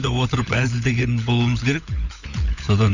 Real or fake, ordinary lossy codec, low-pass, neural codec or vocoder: fake; none; 7.2 kHz; codec, 16 kHz, 16 kbps, FunCodec, trained on LibriTTS, 50 frames a second